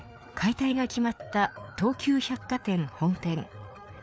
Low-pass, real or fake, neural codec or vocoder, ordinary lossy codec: none; fake; codec, 16 kHz, 4 kbps, FreqCodec, larger model; none